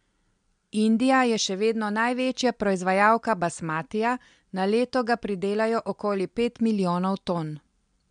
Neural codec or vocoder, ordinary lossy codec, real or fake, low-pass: none; MP3, 64 kbps; real; 9.9 kHz